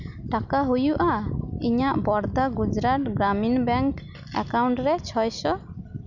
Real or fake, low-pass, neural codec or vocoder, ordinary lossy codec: real; 7.2 kHz; none; none